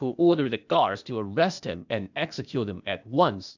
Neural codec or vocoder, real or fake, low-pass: codec, 16 kHz, 0.8 kbps, ZipCodec; fake; 7.2 kHz